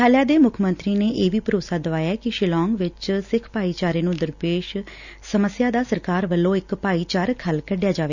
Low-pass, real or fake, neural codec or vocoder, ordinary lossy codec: 7.2 kHz; real; none; none